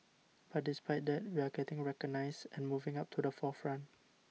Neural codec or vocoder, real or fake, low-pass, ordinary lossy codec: none; real; none; none